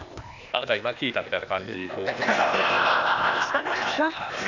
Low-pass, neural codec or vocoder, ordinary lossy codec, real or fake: 7.2 kHz; codec, 16 kHz, 0.8 kbps, ZipCodec; none; fake